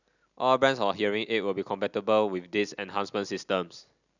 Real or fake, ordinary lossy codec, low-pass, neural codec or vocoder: real; none; 7.2 kHz; none